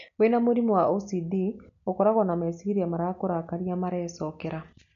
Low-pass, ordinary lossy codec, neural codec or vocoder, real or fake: 7.2 kHz; none; none; real